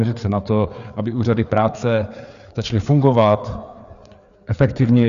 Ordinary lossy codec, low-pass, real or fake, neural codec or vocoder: Opus, 64 kbps; 7.2 kHz; fake; codec, 16 kHz, 4 kbps, FreqCodec, larger model